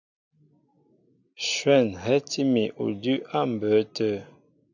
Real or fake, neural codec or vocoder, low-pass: real; none; 7.2 kHz